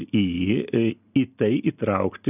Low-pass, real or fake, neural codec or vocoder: 3.6 kHz; real; none